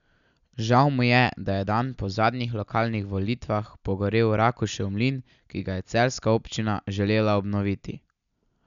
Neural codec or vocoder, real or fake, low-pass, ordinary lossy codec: none; real; 7.2 kHz; none